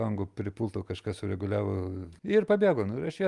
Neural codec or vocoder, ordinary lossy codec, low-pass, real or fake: none; Opus, 32 kbps; 10.8 kHz; real